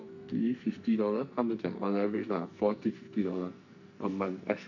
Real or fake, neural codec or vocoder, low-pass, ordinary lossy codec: fake; codec, 44.1 kHz, 2.6 kbps, SNAC; 7.2 kHz; none